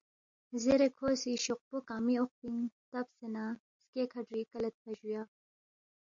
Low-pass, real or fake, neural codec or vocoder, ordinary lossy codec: 7.2 kHz; real; none; MP3, 48 kbps